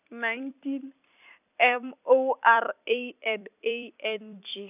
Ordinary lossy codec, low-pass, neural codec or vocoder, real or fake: none; 3.6 kHz; vocoder, 44.1 kHz, 128 mel bands every 256 samples, BigVGAN v2; fake